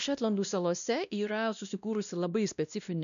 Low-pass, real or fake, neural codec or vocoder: 7.2 kHz; fake; codec, 16 kHz, 1 kbps, X-Codec, WavLM features, trained on Multilingual LibriSpeech